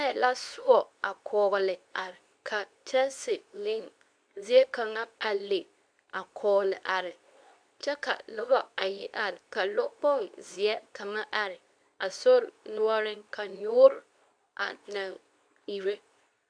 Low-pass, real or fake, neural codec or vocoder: 9.9 kHz; fake; codec, 24 kHz, 0.9 kbps, WavTokenizer, medium speech release version 2